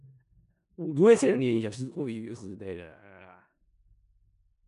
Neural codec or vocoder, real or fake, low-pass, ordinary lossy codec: codec, 16 kHz in and 24 kHz out, 0.4 kbps, LongCat-Audio-Codec, four codebook decoder; fake; 10.8 kHz; none